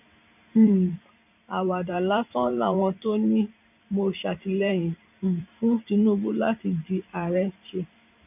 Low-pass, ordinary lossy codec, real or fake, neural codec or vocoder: 3.6 kHz; none; fake; vocoder, 44.1 kHz, 128 mel bands every 512 samples, BigVGAN v2